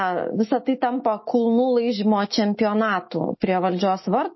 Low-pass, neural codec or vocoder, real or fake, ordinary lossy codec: 7.2 kHz; autoencoder, 48 kHz, 128 numbers a frame, DAC-VAE, trained on Japanese speech; fake; MP3, 24 kbps